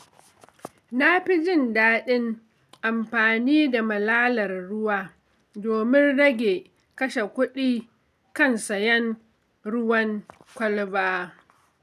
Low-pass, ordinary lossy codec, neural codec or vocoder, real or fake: 14.4 kHz; none; none; real